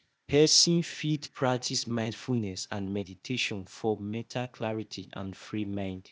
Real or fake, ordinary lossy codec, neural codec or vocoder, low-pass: fake; none; codec, 16 kHz, 0.8 kbps, ZipCodec; none